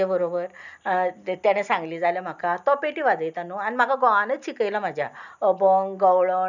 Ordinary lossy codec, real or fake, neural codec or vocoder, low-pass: none; real; none; 7.2 kHz